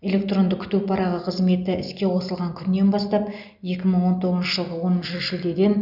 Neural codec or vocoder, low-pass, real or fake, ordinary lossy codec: none; 5.4 kHz; real; none